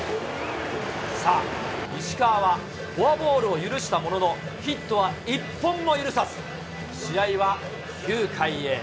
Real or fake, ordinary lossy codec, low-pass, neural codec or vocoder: real; none; none; none